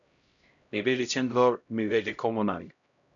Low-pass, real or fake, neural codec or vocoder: 7.2 kHz; fake; codec, 16 kHz, 0.5 kbps, X-Codec, HuBERT features, trained on LibriSpeech